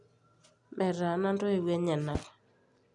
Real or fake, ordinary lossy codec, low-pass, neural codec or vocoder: real; none; 10.8 kHz; none